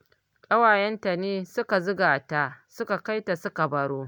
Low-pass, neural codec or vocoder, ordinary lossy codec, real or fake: none; none; none; real